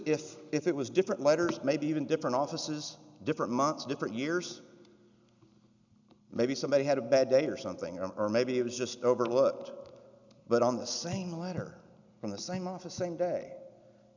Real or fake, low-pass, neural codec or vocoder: real; 7.2 kHz; none